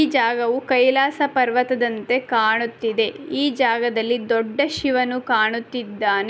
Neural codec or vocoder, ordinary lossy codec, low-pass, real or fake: none; none; none; real